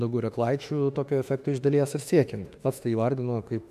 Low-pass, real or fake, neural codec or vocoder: 14.4 kHz; fake; autoencoder, 48 kHz, 32 numbers a frame, DAC-VAE, trained on Japanese speech